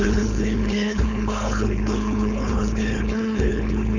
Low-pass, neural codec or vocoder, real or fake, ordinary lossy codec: 7.2 kHz; codec, 16 kHz, 4.8 kbps, FACodec; fake; none